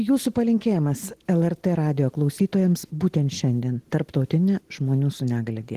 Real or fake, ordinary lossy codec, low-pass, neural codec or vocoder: real; Opus, 16 kbps; 14.4 kHz; none